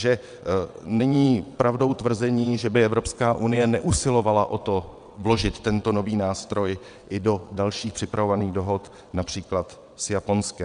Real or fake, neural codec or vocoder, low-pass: fake; vocoder, 22.05 kHz, 80 mel bands, WaveNeXt; 9.9 kHz